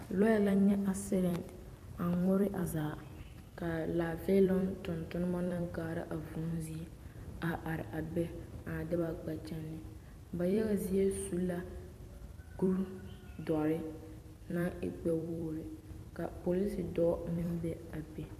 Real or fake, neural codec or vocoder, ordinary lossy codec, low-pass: fake; vocoder, 44.1 kHz, 128 mel bands every 256 samples, BigVGAN v2; AAC, 96 kbps; 14.4 kHz